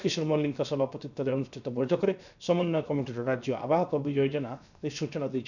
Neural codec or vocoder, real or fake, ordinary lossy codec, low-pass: codec, 16 kHz, 0.7 kbps, FocalCodec; fake; none; 7.2 kHz